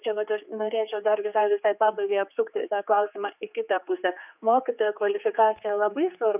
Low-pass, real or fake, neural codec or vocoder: 3.6 kHz; fake; codec, 16 kHz, 4 kbps, X-Codec, HuBERT features, trained on general audio